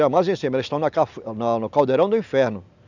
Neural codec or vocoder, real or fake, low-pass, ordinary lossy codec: none; real; 7.2 kHz; none